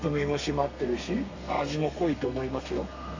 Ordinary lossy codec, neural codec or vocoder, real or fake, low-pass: AAC, 48 kbps; codec, 32 kHz, 1.9 kbps, SNAC; fake; 7.2 kHz